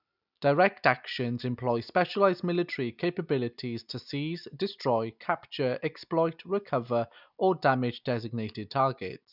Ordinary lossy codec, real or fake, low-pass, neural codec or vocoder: none; real; 5.4 kHz; none